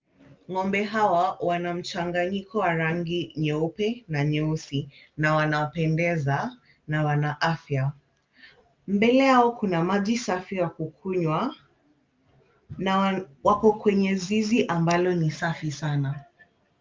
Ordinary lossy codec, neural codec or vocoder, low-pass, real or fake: Opus, 32 kbps; none; 7.2 kHz; real